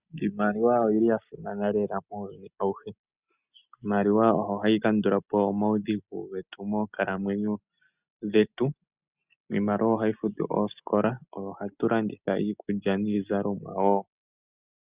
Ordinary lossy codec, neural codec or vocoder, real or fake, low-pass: Opus, 64 kbps; none; real; 3.6 kHz